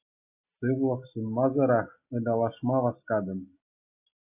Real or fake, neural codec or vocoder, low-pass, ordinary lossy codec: real; none; 3.6 kHz; AAC, 32 kbps